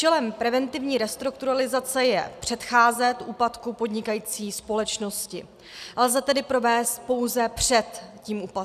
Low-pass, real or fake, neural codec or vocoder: 14.4 kHz; real; none